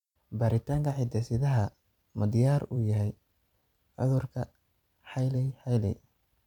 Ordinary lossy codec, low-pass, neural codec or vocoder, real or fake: none; 19.8 kHz; vocoder, 44.1 kHz, 128 mel bands every 256 samples, BigVGAN v2; fake